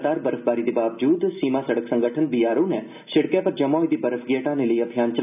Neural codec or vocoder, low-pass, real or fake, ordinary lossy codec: none; 3.6 kHz; real; none